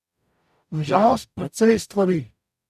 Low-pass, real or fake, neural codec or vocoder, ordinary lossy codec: 14.4 kHz; fake; codec, 44.1 kHz, 0.9 kbps, DAC; none